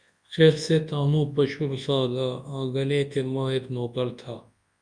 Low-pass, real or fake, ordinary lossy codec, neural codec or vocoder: 9.9 kHz; fake; Opus, 64 kbps; codec, 24 kHz, 0.9 kbps, WavTokenizer, large speech release